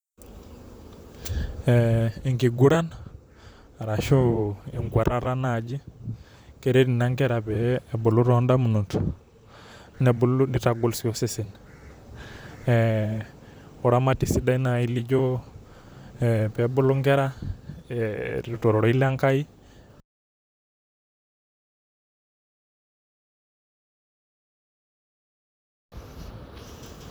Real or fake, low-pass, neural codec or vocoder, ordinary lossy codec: fake; none; vocoder, 44.1 kHz, 128 mel bands, Pupu-Vocoder; none